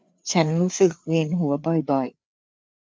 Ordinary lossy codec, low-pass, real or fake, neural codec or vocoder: none; none; fake; codec, 16 kHz, 4 kbps, FreqCodec, larger model